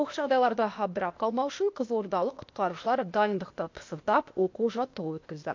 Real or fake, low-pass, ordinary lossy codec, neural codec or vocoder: fake; 7.2 kHz; MP3, 48 kbps; codec, 16 kHz, 0.8 kbps, ZipCodec